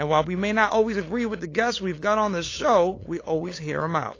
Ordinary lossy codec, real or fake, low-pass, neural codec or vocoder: AAC, 32 kbps; fake; 7.2 kHz; codec, 16 kHz, 8 kbps, FunCodec, trained on LibriTTS, 25 frames a second